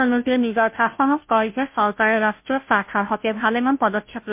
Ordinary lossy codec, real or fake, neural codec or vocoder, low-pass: MP3, 32 kbps; fake; codec, 16 kHz, 0.5 kbps, FunCodec, trained on Chinese and English, 25 frames a second; 3.6 kHz